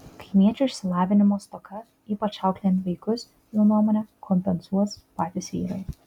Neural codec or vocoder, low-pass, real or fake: none; 19.8 kHz; real